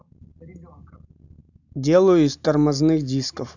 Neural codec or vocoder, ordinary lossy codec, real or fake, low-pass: none; none; real; 7.2 kHz